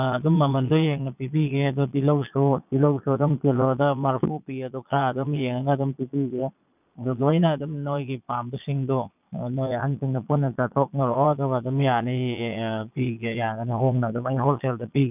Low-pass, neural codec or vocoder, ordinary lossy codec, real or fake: 3.6 kHz; vocoder, 22.05 kHz, 80 mel bands, Vocos; none; fake